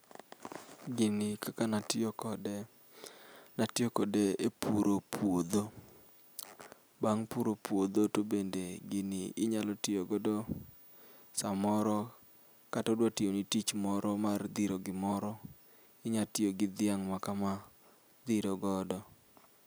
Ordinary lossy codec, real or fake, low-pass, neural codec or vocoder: none; real; none; none